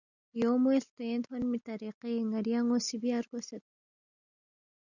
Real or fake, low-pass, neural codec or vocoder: real; 7.2 kHz; none